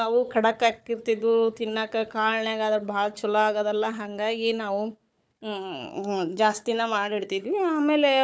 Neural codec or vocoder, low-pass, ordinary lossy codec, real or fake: codec, 16 kHz, 4 kbps, FunCodec, trained on Chinese and English, 50 frames a second; none; none; fake